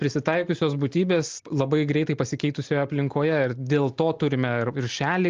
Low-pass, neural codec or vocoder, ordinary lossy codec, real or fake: 7.2 kHz; none; Opus, 32 kbps; real